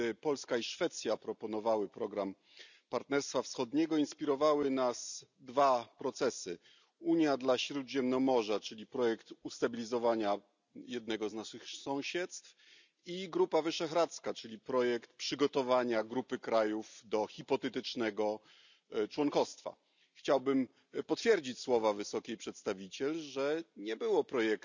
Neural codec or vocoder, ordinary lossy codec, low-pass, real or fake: none; none; 7.2 kHz; real